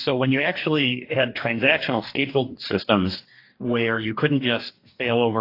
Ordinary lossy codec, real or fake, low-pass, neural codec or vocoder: AAC, 32 kbps; fake; 5.4 kHz; codec, 44.1 kHz, 2.6 kbps, DAC